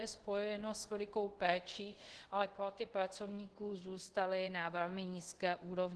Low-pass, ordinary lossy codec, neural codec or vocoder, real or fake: 10.8 kHz; Opus, 16 kbps; codec, 24 kHz, 0.5 kbps, DualCodec; fake